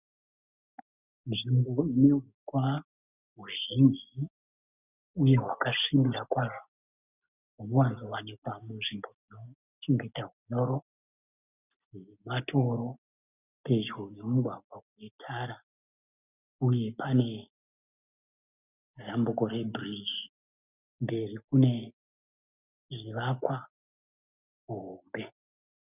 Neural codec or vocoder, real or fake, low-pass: none; real; 3.6 kHz